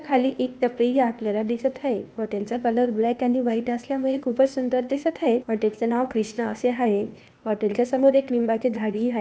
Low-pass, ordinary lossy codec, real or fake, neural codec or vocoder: none; none; fake; codec, 16 kHz, 0.8 kbps, ZipCodec